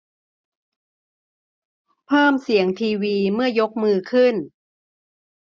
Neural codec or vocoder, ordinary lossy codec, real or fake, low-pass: none; none; real; none